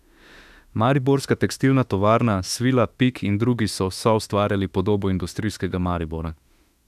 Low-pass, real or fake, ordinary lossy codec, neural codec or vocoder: 14.4 kHz; fake; none; autoencoder, 48 kHz, 32 numbers a frame, DAC-VAE, trained on Japanese speech